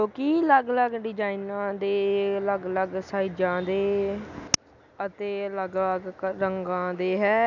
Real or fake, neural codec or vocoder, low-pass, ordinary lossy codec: real; none; 7.2 kHz; none